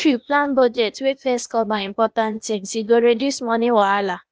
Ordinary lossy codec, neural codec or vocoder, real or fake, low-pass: none; codec, 16 kHz, 0.8 kbps, ZipCodec; fake; none